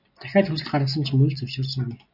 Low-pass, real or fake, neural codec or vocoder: 5.4 kHz; real; none